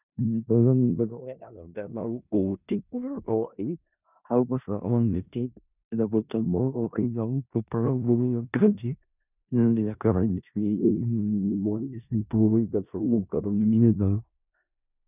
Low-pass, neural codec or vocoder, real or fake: 3.6 kHz; codec, 16 kHz in and 24 kHz out, 0.4 kbps, LongCat-Audio-Codec, four codebook decoder; fake